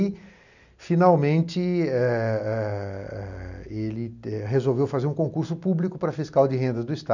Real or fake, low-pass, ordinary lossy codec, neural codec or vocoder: real; 7.2 kHz; none; none